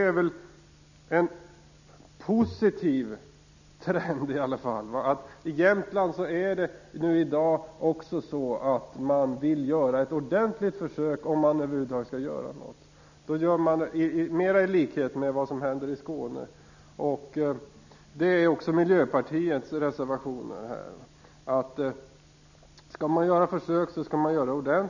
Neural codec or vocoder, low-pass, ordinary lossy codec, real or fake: none; 7.2 kHz; none; real